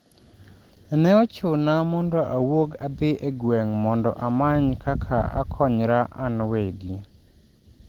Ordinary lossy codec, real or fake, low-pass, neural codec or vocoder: Opus, 16 kbps; real; 19.8 kHz; none